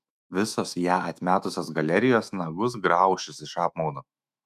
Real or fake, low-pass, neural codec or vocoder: fake; 14.4 kHz; autoencoder, 48 kHz, 128 numbers a frame, DAC-VAE, trained on Japanese speech